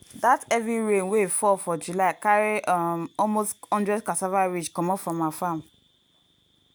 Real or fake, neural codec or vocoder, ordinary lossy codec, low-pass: real; none; none; none